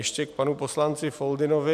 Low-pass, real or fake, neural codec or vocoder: 14.4 kHz; real; none